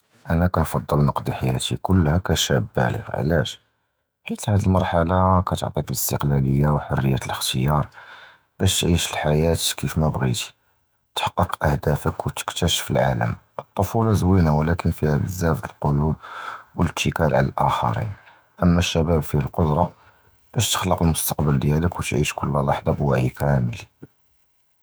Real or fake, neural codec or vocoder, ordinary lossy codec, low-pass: fake; autoencoder, 48 kHz, 128 numbers a frame, DAC-VAE, trained on Japanese speech; none; none